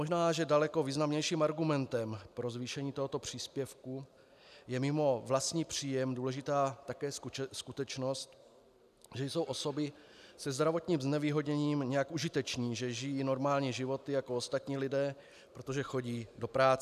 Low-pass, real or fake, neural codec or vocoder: 14.4 kHz; real; none